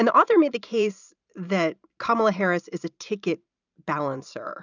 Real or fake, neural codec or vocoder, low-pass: fake; vocoder, 44.1 kHz, 128 mel bands every 512 samples, BigVGAN v2; 7.2 kHz